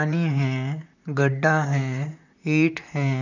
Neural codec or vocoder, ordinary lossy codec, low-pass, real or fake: vocoder, 44.1 kHz, 128 mel bands, Pupu-Vocoder; none; 7.2 kHz; fake